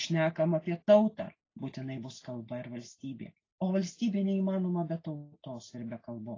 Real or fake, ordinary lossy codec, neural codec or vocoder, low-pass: real; AAC, 32 kbps; none; 7.2 kHz